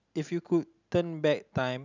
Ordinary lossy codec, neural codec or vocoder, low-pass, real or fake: none; none; 7.2 kHz; real